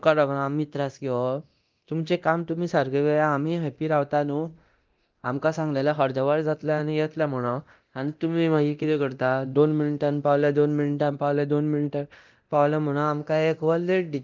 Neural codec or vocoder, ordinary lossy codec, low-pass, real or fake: codec, 24 kHz, 0.9 kbps, DualCodec; Opus, 32 kbps; 7.2 kHz; fake